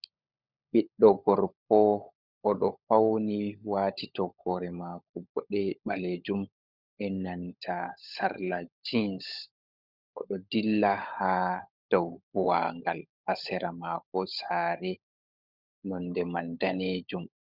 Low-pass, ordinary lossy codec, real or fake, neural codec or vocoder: 5.4 kHz; Opus, 64 kbps; fake; codec, 16 kHz, 16 kbps, FunCodec, trained on LibriTTS, 50 frames a second